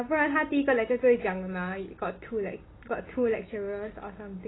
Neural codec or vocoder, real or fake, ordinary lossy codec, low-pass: none; real; AAC, 16 kbps; 7.2 kHz